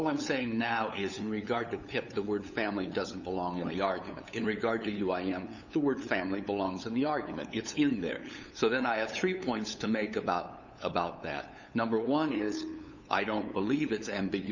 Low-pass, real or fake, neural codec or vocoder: 7.2 kHz; fake; codec, 16 kHz, 8 kbps, FunCodec, trained on LibriTTS, 25 frames a second